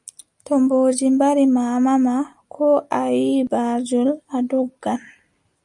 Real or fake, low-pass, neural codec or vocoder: real; 10.8 kHz; none